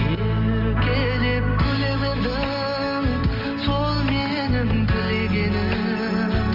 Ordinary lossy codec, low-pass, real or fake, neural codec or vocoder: Opus, 24 kbps; 5.4 kHz; real; none